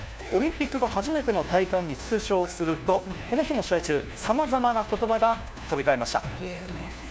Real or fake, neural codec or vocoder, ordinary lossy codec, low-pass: fake; codec, 16 kHz, 1 kbps, FunCodec, trained on LibriTTS, 50 frames a second; none; none